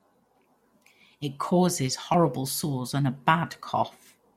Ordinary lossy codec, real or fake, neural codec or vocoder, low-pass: MP3, 64 kbps; real; none; 19.8 kHz